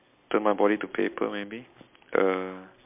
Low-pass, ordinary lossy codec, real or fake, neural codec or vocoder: 3.6 kHz; MP3, 32 kbps; real; none